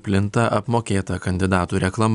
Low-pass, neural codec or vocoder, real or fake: 10.8 kHz; none; real